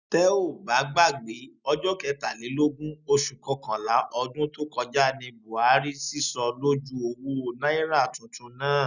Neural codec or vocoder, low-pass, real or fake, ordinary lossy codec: none; 7.2 kHz; real; none